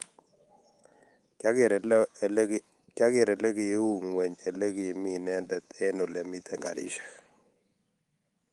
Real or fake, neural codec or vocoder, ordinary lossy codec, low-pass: fake; codec, 24 kHz, 3.1 kbps, DualCodec; Opus, 24 kbps; 10.8 kHz